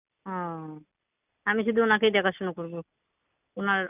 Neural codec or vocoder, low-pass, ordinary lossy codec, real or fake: none; 3.6 kHz; none; real